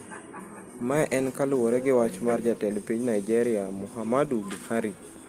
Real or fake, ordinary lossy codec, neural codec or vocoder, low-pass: real; Opus, 24 kbps; none; 14.4 kHz